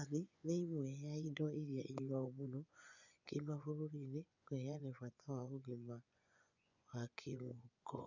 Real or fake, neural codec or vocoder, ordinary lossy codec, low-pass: fake; vocoder, 24 kHz, 100 mel bands, Vocos; none; 7.2 kHz